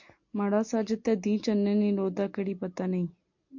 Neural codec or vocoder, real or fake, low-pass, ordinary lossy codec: none; real; 7.2 kHz; MP3, 48 kbps